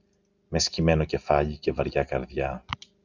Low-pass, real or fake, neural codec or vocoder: 7.2 kHz; real; none